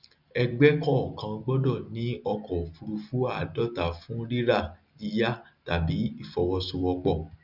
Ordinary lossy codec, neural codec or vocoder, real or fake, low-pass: none; none; real; 5.4 kHz